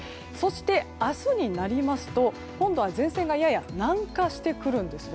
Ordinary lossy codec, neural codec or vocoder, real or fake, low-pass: none; none; real; none